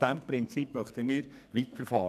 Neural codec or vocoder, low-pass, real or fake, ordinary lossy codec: codec, 44.1 kHz, 2.6 kbps, SNAC; 14.4 kHz; fake; none